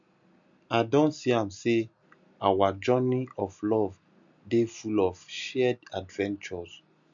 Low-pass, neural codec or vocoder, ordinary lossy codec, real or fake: 7.2 kHz; none; none; real